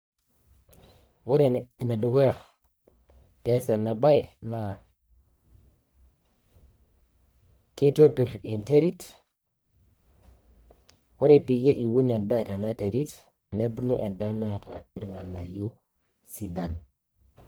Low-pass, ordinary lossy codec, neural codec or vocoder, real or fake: none; none; codec, 44.1 kHz, 1.7 kbps, Pupu-Codec; fake